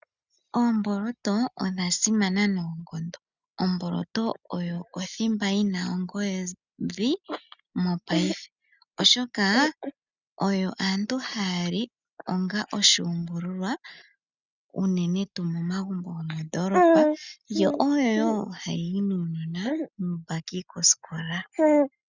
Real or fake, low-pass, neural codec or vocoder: real; 7.2 kHz; none